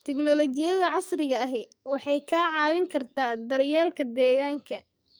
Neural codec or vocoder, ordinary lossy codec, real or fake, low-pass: codec, 44.1 kHz, 2.6 kbps, SNAC; none; fake; none